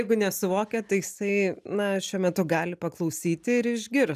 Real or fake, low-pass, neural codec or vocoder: real; 14.4 kHz; none